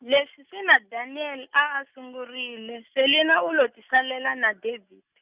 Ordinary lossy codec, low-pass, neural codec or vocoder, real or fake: Opus, 64 kbps; 3.6 kHz; none; real